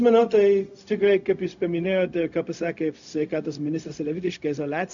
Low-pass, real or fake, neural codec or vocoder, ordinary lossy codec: 7.2 kHz; fake; codec, 16 kHz, 0.4 kbps, LongCat-Audio-Codec; MP3, 96 kbps